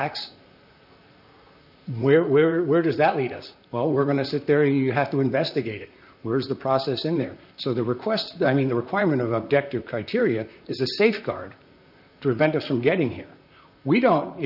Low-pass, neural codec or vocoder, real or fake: 5.4 kHz; vocoder, 44.1 kHz, 128 mel bands, Pupu-Vocoder; fake